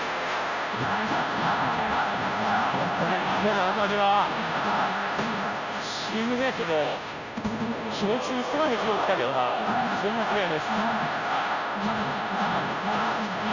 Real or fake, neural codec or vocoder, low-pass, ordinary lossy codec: fake; codec, 16 kHz, 0.5 kbps, FunCodec, trained on Chinese and English, 25 frames a second; 7.2 kHz; none